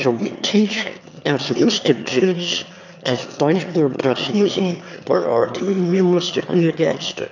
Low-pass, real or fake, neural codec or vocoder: 7.2 kHz; fake; autoencoder, 22.05 kHz, a latent of 192 numbers a frame, VITS, trained on one speaker